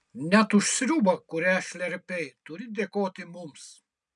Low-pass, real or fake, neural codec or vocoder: 10.8 kHz; real; none